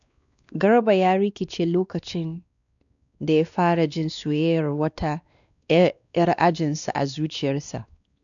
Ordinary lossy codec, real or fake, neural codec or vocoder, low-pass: none; fake; codec, 16 kHz, 2 kbps, X-Codec, WavLM features, trained on Multilingual LibriSpeech; 7.2 kHz